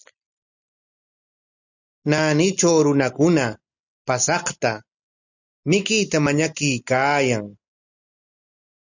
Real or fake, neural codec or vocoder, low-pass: real; none; 7.2 kHz